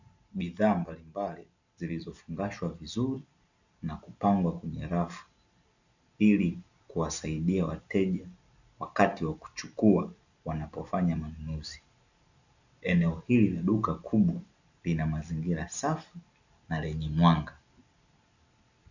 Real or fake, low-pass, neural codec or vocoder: real; 7.2 kHz; none